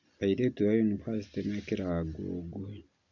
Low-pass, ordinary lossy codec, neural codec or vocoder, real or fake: 7.2 kHz; none; none; real